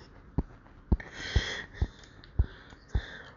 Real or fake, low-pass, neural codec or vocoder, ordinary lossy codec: real; 7.2 kHz; none; none